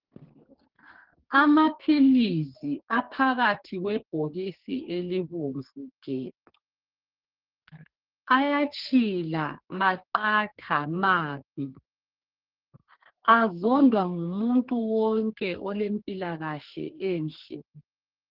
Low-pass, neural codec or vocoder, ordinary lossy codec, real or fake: 5.4 kHz; codec, 32 kHz, 1.9 kbps, SNAC; Opus, 16 kbps; fake